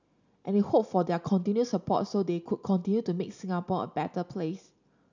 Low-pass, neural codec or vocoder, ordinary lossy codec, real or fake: 7.2 kHz; none; none; real